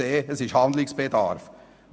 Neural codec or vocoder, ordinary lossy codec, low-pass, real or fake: none; none; none; real